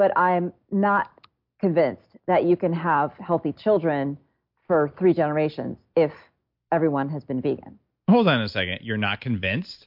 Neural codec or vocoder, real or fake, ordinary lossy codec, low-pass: none; real; MP3, 48 kbps; 5.4 kHz